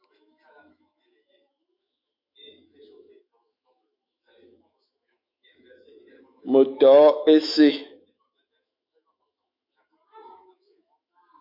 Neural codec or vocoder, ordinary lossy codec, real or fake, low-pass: autoencoder, 48 kHz, 128 numbers a frame, DAC-VAE, trained on Japanese speech; MP3, 48 kbps; fake; 5.4 kHz